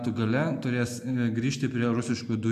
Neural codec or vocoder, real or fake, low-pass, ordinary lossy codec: none; real; 14.4 kHz; AAC, 96 kbps